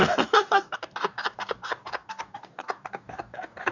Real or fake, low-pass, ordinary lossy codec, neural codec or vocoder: fake; 7.2 kHz; none; codec, 44.1 kHz, 2.6 kbps, DAC